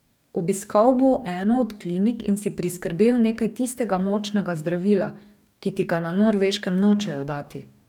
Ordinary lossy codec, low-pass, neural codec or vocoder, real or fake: none; 19.8 kHz; codec, 44.1 kHz, 2.6 kbps, DAC; fake